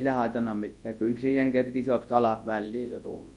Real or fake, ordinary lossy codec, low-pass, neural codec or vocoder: fake; MP3, 48 kbps; 10.8 kHz; codec, 24 kHz, 0.9 kbps, WavTokenizer, large speech release